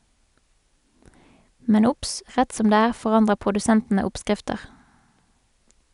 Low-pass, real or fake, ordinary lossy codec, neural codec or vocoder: 10.8 kHz; real; none; none